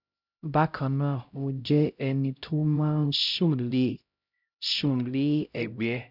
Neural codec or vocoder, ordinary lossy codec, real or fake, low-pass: codec, 16 kHz, 0.5 kbps, X-Codec, HuBERT features, trained on LibriSpeech; none; fake; 5.4 kHz